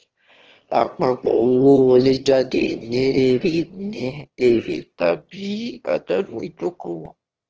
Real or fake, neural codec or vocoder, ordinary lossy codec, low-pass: fake; autoencoder, 22.05 kHz, a latent of 192 numbers a frame, VITS, trained on one speaker; Opus, 16 kbps; 7.2 kHz